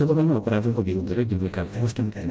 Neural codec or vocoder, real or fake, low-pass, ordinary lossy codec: codec, 16 kHz, 0.5 kbps, FreqCodec, smaller model; fake; none; none